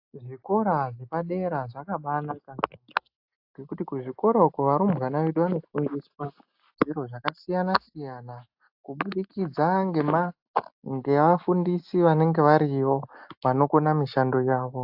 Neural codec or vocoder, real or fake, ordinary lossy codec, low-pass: none; real; AAC, 48 kbps; 5.4 kHz